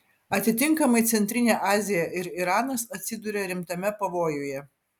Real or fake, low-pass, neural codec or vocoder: real; 19.8 kHz; none